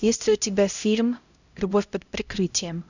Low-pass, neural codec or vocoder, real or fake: 7.2 kHz; codec, 16 kHz, 0.5 kbps, X-Codec, HuBERT features, trained on LibriSpeech; fake